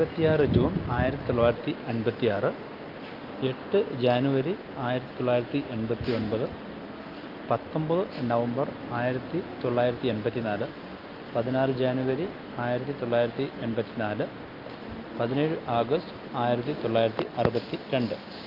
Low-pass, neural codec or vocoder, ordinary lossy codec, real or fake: 5.4 kHz; none; Opus, 32 kbps; real